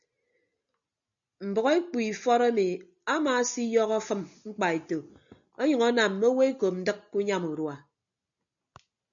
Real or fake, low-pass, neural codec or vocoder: real; 7.2 kHz; none